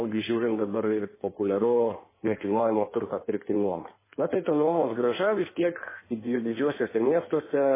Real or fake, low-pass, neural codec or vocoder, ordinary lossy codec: fake; 3.6 kHz; codec, 16 kHz in and 24 kHz out, 1.1 kbps, FireRedTTS-2 codec; MP3, 16 kbps